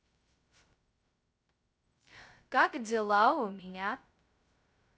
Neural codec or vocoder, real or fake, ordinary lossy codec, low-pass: codec, 16 kHz, 0.2 kbps, FocalCodec; fake; none; none